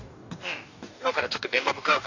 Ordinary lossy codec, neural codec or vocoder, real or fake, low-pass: none; codec, 44.1 kHz, 2.6 kbps, DAC; fake; 7.2 kHz